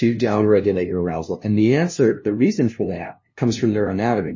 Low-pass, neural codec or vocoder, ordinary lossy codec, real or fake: 7.2 kHz; codec, 16 kHz, 0.5 kbps, FunCodec, trained on LibriTTS, 25 frames a second; MP3, 32 kbps; fake